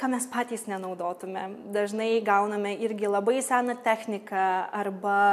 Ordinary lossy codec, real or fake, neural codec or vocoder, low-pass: MP3, 96 kbps; real; none; 14.4 kHz